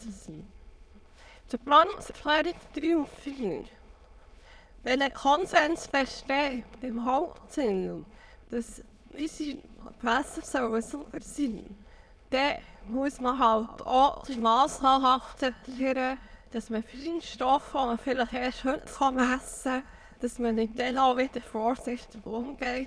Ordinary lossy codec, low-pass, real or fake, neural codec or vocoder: none; none; fake; autoencoder, 22.05 kHz, a latent of 192 numbers a frame, VITS, trained on many speakers